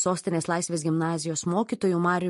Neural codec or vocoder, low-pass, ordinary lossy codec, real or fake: none; 14.4 kHz; MP3, 48 kbps; real